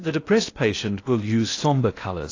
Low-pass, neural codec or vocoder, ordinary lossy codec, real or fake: 7.2 kHz; codec, 16 kHz in and 24 kHz out, 0.8 kbps, FocalCodec, streaming, 65536 codes; AAC, 32 kbps; fake